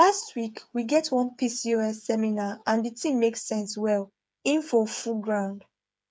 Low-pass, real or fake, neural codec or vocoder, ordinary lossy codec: none; fake; codec, 16 kHz, 8 kbps, FreqCodec, smaller model; none